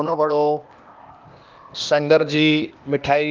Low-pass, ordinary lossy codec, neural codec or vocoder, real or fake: 7.2 kHz; Opus, 24 kbps; codec, 16 kHz, 0.8 kbps, ZipCodec; fake